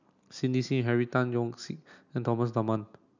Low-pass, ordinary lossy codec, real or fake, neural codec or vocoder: 7.2 kHz; none; real; none